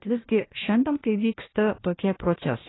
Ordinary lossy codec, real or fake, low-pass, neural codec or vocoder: AAC, 16 kbps; fake; 7.2 kHz; codec, 16 kHz, 1 kbps, FunCodec, trained on Chinese and English, 50 frames a second